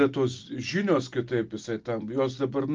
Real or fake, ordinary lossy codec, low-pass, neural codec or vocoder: real; Opus, 32 kbps; 7.2 kHz; none